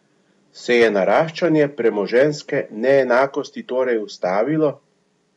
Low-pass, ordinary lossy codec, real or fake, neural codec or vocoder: 10.8 kHz; MP3, 64 kbps; real; none